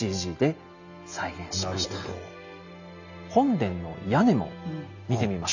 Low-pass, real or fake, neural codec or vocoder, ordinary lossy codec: 7.2 kHz; real; none; none